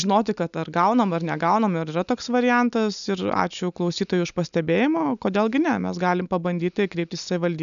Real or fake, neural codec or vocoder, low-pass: real; none; 7.2 kHz